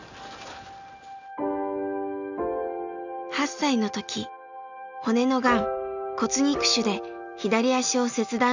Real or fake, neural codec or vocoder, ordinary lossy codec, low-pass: real; none; none; 7.2 kHz